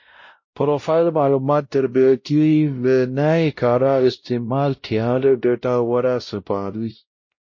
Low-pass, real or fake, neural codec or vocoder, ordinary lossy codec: 7.2 kHz; fake; codec, 16 kHz, 0.5 kbps, X-Codec, WavLM features, trained on Multilingual LibriSpeech; MP3, 32 kbps